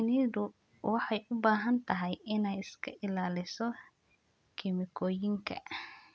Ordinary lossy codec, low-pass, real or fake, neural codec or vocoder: none; none; real; none